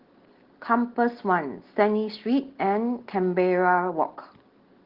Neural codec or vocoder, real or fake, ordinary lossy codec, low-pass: none; real; Opus, 16 kbps; 5.4 kHz